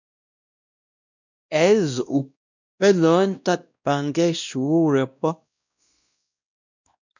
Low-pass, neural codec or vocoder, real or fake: 7.2 kHz; codec, 16 kHz, 1 kbps, X-Codec, WavLM features, trained on Multilingual LibriSpeech; fake